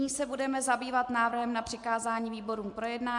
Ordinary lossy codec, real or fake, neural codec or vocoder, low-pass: MP3, 64 kbps; real; none; 10.8 kHz